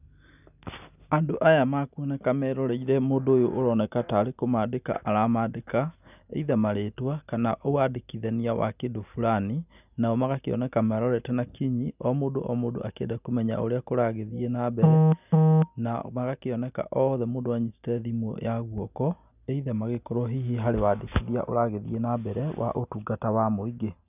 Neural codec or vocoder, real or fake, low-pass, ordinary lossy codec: none; real; 3.6 kHz; none